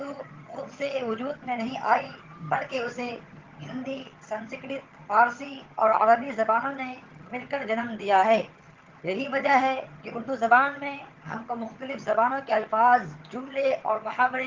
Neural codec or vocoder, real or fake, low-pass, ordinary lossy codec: vocoder, 22.05 kHz, 80 mel bands, HiFi-GAN; fake; 7.2 kHz; Opus, 16 kbps